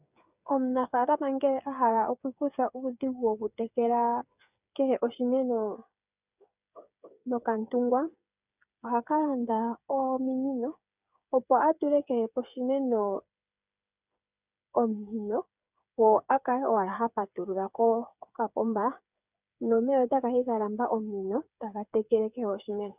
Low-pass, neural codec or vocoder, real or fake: 3.6 kHz; codec, 16 kHz, 8 kbps, FreqCodec, smaller model; fake